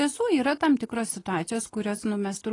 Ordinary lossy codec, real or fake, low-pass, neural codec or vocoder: AAC, 32 kbps; real; 10.8 kHz; none